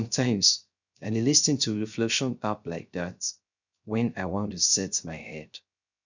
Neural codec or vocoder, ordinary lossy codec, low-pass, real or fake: codec, 16 kHz, 0.3 kbps, FocalCodec; none; 7.2 kHz; fake